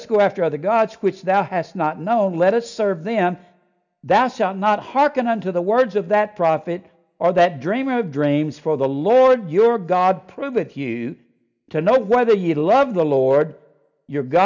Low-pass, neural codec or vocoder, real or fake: 7.2 kHz; none; real